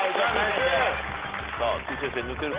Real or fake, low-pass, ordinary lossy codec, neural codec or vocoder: real; 3.6 kHz; Opus, 16 kbps; none